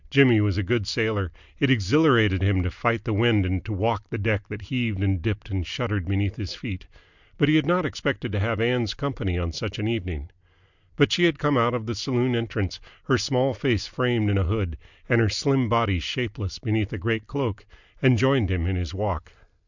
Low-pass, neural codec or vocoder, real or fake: 7.2 kHz; none; real